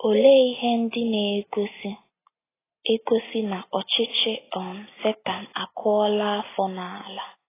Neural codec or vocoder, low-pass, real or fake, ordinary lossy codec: none; 3.6 kHz; real; AAC, 16 kbps